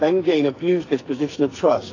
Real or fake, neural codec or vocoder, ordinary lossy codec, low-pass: fake; codec, 44.1 kHz, 2.6 kbps, SNAC; AAC, 32 kbps; 7.2 kHz